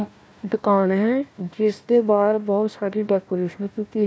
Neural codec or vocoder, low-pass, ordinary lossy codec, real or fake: codec, 16 kHz, 1 kbps, FunCodec, trained on Chinese and English, 50 frames a second; none; none; fake